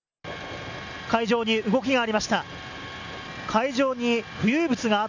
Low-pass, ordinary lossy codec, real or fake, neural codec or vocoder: 7.2 kHz; none; real; none